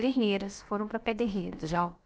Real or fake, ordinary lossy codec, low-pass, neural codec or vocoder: fake; none; none; codec, 16 kHz, about 1 kbps, DyCAST, with the encoder's durations